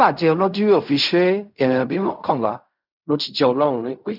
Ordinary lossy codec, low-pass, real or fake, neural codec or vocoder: none; 5.4 kHz; fake; codec, 16 kHz in and 24 kHz out, 0.4 kbps, LongCat-Audio-Codec, fine tuned four codebook decoder